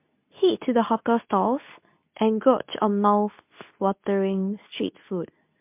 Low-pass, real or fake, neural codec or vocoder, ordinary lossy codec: 3.6 kHz; fake; codec, 24 kHz, 0.9 kbps, WavTokenizer, medium speech release version 2; MP3, 32 kbps